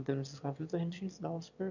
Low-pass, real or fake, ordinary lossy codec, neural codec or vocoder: 7.2 kHz; fake; none; autoencoder, 22.05 kHz, a latent of 192 numbers a frame, VITS, trained on one speaker